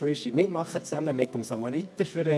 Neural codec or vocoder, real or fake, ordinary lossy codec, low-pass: codec, 24 kHz, 0.9 kbps, WavTokenizer, medium music audio release; fake; none; none